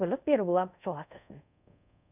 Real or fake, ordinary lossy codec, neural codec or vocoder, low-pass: fake; none; codec, 24 kHz, 0.5 kbps, DualCodec; 3.6 kHz